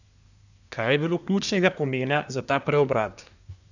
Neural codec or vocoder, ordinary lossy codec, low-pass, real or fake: codec, 24 kHz, 1 kbps, SNAC; none; 7.2 kHz; fake